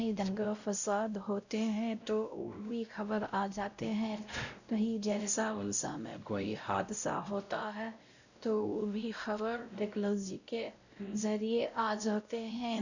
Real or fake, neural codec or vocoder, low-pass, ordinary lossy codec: fake; codec, 16 kHz, 0.5 kbps, X-Codec, WavLM features, trained on Multilingual LibriSpeech; 7.2 kHz; none